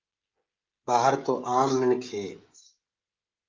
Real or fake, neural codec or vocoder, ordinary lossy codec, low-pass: fake; codec, 16 kHz, 16 kbps, FreqCodec, smaller model; Opus, 32 kbps; 7.2 kHz